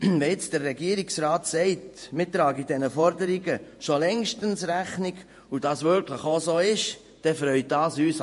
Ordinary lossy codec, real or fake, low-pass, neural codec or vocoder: MP3, 48 kbps; fake; 14.4 kHz; vocoder, 48 kHz, 128 mel bands, Vocos